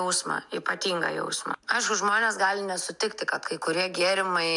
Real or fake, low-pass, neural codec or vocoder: real; 10.8 kHz; none